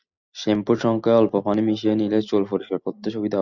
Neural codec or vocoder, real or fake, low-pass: none; real; 7.2 kHz